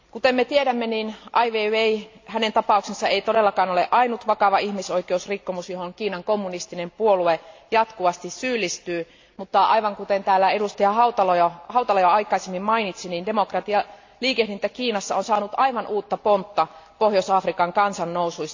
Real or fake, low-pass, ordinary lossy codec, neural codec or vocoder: real; 7.2 kHz; AAC, 48 kbps; none